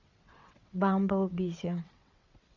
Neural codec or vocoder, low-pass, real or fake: vocoder, 22.05 kHz, 80 mel bands, WaveNeXt; 7.2 kHz; fake